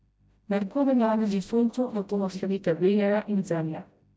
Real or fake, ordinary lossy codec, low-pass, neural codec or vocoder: fake; none; none; codec, 16 kHz, 0.5 kbps, FreqCodec, smaller model